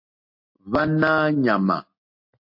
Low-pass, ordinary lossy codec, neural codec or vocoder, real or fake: 5.4 kHz; MP3, 32 kbps; none; real